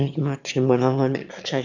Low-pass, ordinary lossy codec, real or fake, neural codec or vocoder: 7.2 kHz; none; fake; autoencoder, 22.05 kHz, a latent of 192 numbers a frame, VITS, trained on one speaker